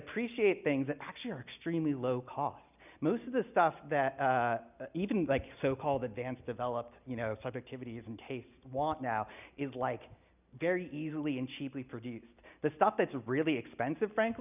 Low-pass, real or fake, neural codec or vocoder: 3.6 kHz; real; none